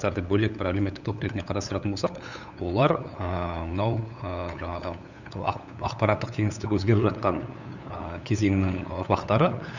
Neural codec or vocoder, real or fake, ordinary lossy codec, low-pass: codec, 16 kHz, 8 kbps, FunCodec, trained on LibriTTS, 25 frames a second; fake; none; 7.2 kHz